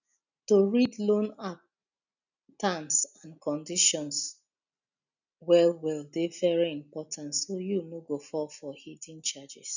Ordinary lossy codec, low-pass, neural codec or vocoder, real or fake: none; 7.2 kHz; none; real